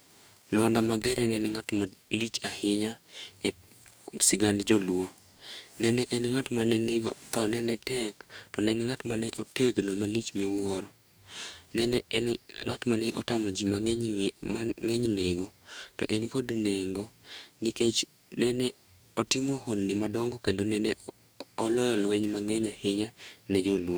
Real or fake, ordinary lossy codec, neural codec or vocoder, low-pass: fake; none; codec, 44.1 kHz, 2.6 kbps, DAC; none